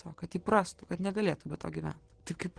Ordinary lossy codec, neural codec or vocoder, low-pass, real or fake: Opus, 16 kbps; vocoder, 44.1 kHz, 128 mel bands every 512 samples, BigVGAN v2; 9.9 kHz; fake